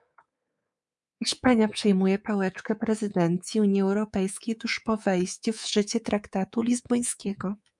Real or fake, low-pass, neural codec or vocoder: fake; 10.8 kHz; codec, 24 kHz, 3.1 kbps, DualCodec